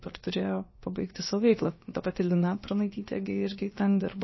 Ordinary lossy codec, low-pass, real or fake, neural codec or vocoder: MP3, 24 kbps; 7.2 kHz; fake; codec, 16 kHz, about 1 kbps, DyCAST, with the encoder's durations